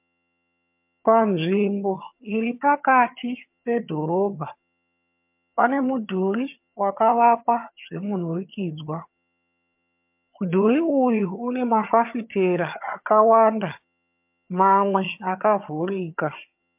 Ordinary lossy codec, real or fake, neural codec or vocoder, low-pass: MP3, 32 kbps; fake; vocoder, 22.05 kHz, 80 mel bands, HiFi-GAN; 3.6 kHz